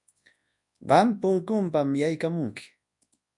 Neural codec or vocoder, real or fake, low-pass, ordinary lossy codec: codec, 24 kHz, 0.9 kbps, WavTokenizer, large speech release; fake; 10.8 kHz; MP3, 64 kbps